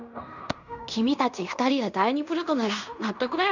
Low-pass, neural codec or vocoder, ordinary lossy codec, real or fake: 7.2 kHz; codec, 16 kHz in and 24 kHz out, 0.9 kbps, LongCat-Audio-Codec, fine tuned four codebook decoder; none; fake